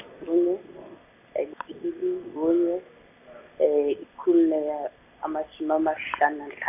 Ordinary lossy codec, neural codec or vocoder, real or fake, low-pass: none; none; real; 3.6 kHz